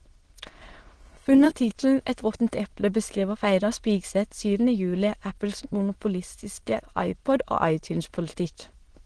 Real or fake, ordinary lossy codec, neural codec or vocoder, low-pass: fake; Opus, 16 kbps; autoencoder, 22.05 kHz, a latent of 192 numbers a frame, VITS, trained on many speakers; 9.9 kHz